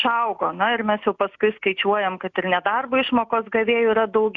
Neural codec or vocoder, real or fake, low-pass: none; real; 7.2 kHz